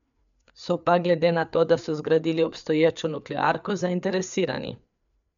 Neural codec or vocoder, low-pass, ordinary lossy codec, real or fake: codec, 16 kHz, 4 kbps, FreqCodec, larger model; 7.2 kHz; MP3, 96 kbps; fake